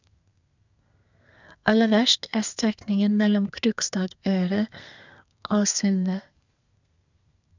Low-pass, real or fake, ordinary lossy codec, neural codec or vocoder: 7.2 kHz; fake; none; codec, 32 kHz, 1.9 kbps, SNAC